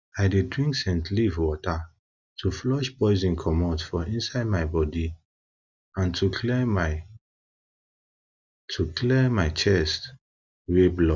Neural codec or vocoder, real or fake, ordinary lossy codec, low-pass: none; real; none; 7.2 kHz